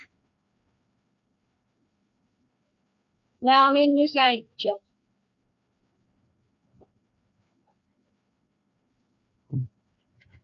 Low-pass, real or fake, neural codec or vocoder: 7.2 kHz; fake; codec, 16 kHz, 2 kbps, FreqCodec, larger model